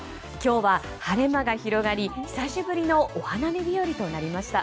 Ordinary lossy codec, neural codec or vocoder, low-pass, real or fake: none; none; none; real